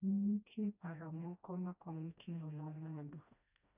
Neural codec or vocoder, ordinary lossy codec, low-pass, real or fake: codec, 16 kHz, 1 kbps, FreqCodec, smaller model; none; 3.6 kHz; fake